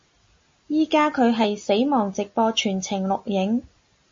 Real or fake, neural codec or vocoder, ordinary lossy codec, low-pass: real; none; MP3, 32 kbps; 7.2 kHz